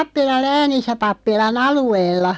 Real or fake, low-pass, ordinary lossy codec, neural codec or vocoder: real; none; none; none